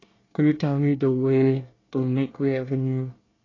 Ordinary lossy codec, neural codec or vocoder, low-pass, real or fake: AAC, 32 kbps; codec, 24 kHz, 1 kbps, SNAC; 7.2 kHz; fake